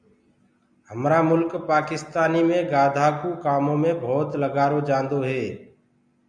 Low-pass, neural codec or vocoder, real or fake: 9.9 kHz; none; real